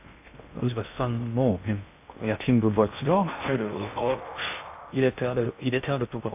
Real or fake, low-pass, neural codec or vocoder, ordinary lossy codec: fake; 3.6 kHz; codec, 16 kHz in and 24 kHz out, 0.6 kbps, FocalCodec, streaming, 2048 codes; AAC, 32 kbps